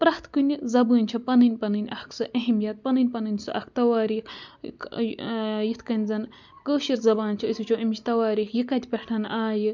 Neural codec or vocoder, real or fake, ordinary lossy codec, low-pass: none; real; none; 7.2 kHz